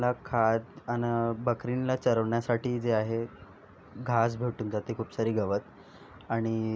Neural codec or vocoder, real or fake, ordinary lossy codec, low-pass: none; real; none; none